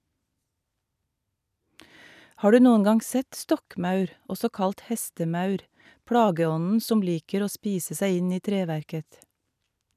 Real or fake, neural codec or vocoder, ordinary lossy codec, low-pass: real; none; none; 14.4 kHz